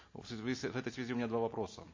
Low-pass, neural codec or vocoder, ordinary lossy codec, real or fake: 7.2 kHz; none; MP3, 32 kbps; real